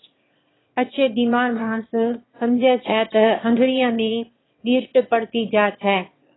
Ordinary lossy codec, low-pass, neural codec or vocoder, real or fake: AAC, 16 kbps; 7.2 kHz; autoencoder, 22.05 kHz, a latent of 192 numbers a frame, VITS, trained on one speaker; fake